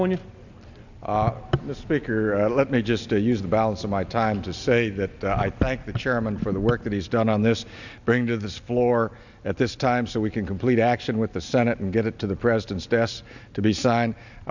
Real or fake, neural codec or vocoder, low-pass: real; none; 7.2 kHz